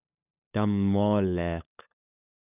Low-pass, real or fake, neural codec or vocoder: 3.6 kHz; fake; codec, 16 kHz, 8 kbps, FunCodec, trained on LibriTTS, 25 frames a second